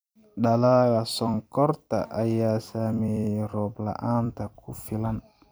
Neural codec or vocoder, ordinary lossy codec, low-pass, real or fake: vocoder, 44.1 kHz, 128 mel bands every 256 samples, BigVGAN v2; none; none; fake